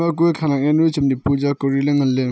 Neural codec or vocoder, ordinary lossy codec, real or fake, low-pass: none; none; real; none